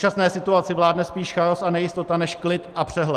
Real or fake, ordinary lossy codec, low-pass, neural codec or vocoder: real; Opus, 24 kbps; 14.4 kHz; none